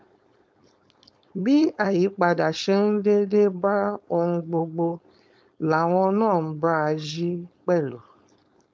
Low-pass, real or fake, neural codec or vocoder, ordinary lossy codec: none; fake; codec, 16 kHz, 4.8 kbps, FACodec; none